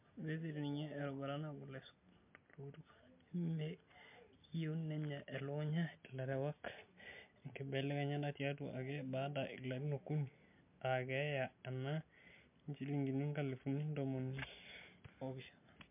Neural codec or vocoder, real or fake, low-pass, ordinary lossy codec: none; real; 3.6 kHz; MP3, 32 kbps